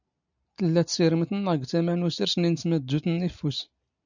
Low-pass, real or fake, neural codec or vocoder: 7.2 kHz; real; none